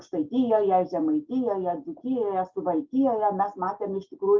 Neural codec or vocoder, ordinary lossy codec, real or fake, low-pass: none; Opus, 24 kbps; real; 7.2 kHz